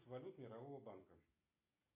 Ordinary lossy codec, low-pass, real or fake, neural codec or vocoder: MP3, 24 kbps; 3.6 kHz; real; none